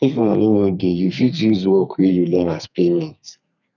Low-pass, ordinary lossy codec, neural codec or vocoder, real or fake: 7.2 kHz; none; codec, 32 kHz, 1.9 kbps, SNAC; fake